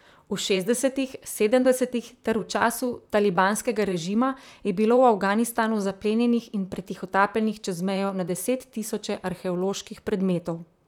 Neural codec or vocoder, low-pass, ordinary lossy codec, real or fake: vocoder, 44.1 kHz, 128 mel bands, Pupu-Vocoder; 19.8 kHz; none; fake